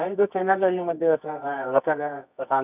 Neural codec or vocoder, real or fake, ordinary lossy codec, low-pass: codec, 24 kHz, 0.9 kbps, WavTokenizer, medium music audio release; fake; none; 3.6 kHz